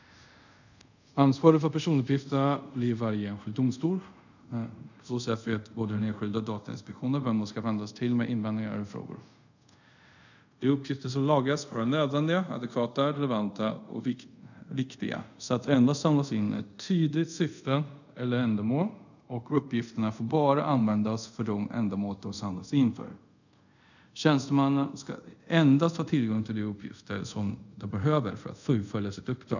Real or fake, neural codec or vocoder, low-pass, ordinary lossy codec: fake; codec, 24 kHz, 0.5 kbps, DualCodec; 7.2 kHz; none